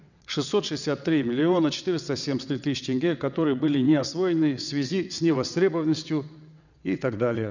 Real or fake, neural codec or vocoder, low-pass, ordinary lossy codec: fake; vocoder, 22.05 kHz, 80 mel bands, WaveNeXt; 7.2 kHz; none